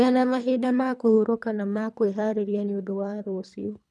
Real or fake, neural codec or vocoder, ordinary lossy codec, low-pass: fake; codec, 24 kHz, 3 kbps, HILCodec; none; none